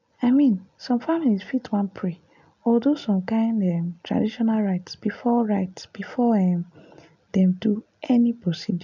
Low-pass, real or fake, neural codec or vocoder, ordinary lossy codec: 7.2 kHz; real; none; none